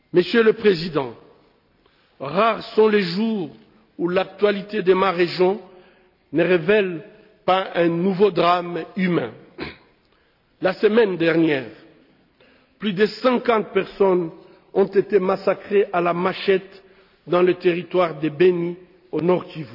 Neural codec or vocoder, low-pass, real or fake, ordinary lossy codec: none; 5.4 kHz; real; none